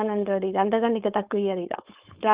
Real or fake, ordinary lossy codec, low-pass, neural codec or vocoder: fake; Opus, 32 kbps; 3.6 kHz; codec, 16 kHz, 4.8 kbps, FACodec